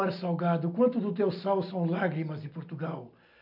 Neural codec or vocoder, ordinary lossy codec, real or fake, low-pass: none; MP3, 48 kbps; real; 5.4 kHz